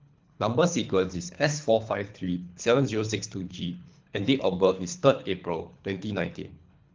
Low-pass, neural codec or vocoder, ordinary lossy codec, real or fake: 7.2 kHz; codec, 24 kHz, 3 kbps, HILCodec; Opus, 24 kbps; fake